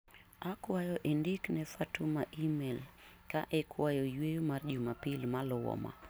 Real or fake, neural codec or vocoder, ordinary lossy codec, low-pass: real; none; none; none